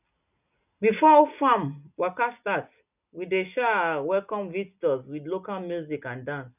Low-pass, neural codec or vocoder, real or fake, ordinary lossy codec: 3.6 kHz; none; real; none